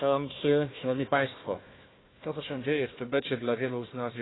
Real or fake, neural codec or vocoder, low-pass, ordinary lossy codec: fake; codec, 16 kHz, 1 kbps, FunCodec, trained on Chinese and English, 50 frames a second; 7.2 kHz; AAC, 16 kbps